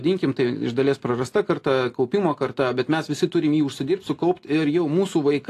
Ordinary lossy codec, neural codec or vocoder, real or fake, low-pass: AAC, 48 kbps; none; real; 14.4 kHz